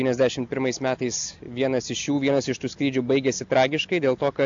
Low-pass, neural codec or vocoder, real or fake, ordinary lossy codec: 7.2 kHz; none; real; AAC, 64 kbps